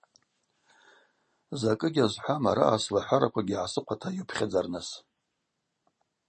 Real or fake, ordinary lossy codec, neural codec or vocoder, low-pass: real; MP3, 32 kbps; none; 10.8 kHz